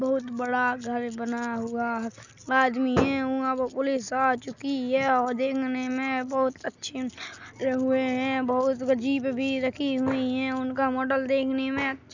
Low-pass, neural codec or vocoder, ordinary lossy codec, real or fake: 7.2 kHz; none; none; real